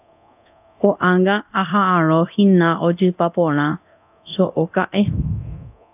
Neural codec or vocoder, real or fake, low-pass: codec, 24 kHz, 0.9 kbps, DualCodec; fake; 3.6 kHz